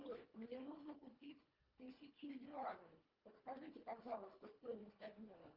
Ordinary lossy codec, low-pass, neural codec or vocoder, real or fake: Opus, 24 kbps; 5.4 kHz; codec, 24 kHz, 1.5 kbps, HILCodec; fake